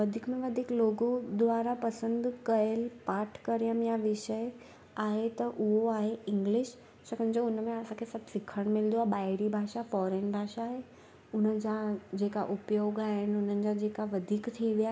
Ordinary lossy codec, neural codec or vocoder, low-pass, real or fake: none; none; none; real